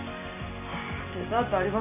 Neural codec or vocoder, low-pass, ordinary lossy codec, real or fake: none; 3.6 kHz; none; real